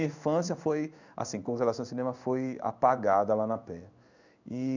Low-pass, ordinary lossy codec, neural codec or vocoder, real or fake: 7.2 kHz; none; codec, 16 kHz in and 24 kHz out, 1 kbps, XY-Tokenizer; fake